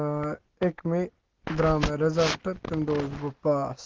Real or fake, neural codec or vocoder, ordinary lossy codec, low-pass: real; none; Opus, 16 kbps; 7.2 kHz